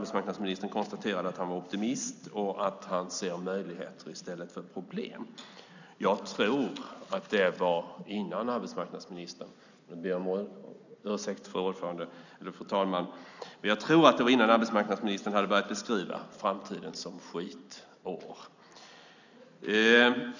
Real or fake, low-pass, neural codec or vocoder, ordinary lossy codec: real; 7.2 kHz; none; none